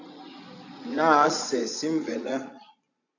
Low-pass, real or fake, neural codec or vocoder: 7.2 kHz; fake; vocoder, 22.05 kHz, 80 mel bands, Vocos